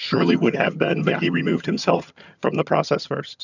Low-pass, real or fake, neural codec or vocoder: 7.2 kHz; fake; vocoder, 22.05 kHz, 80 mel bands, HiFi-GAN